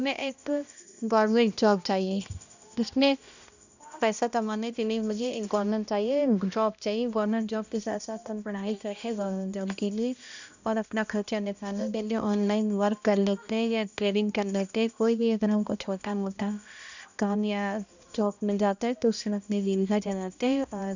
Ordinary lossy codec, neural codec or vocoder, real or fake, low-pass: none; codec, 16 kHz, 1 kbps, X-Codec, HuBERT features, trained on balanced general audio; fake; 7.2 kHz